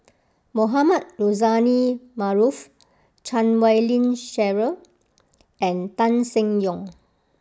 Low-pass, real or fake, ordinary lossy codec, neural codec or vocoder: none; real; none; none